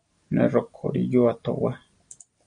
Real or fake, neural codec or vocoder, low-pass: real; none; 9.9 kHz